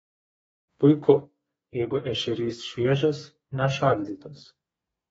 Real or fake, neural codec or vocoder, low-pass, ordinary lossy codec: fake; codec, 32 kHz, 1.9 kbps, SNAC; 14.4 kHz; AAC, 24 kbps